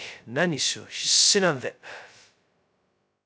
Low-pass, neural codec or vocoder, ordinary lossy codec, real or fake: none; codec, 16 kHz, 0.2 kbps, FocalCodec; none; fake